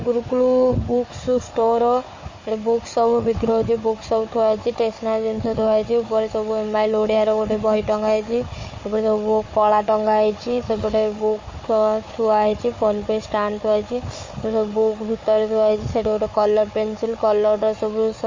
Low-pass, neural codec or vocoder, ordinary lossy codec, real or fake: 7.2 kHz; codec, 16 kHz, 4 kbps, FunCodec, trained on Chinese and English, 50 frames a second; MP3, 32 kbps; fake